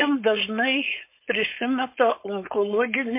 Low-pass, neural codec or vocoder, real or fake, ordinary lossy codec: 3.6 kHz; codec, 16 kHz, 4.8 kbps, FACodec; fake; MP3, 24 kbps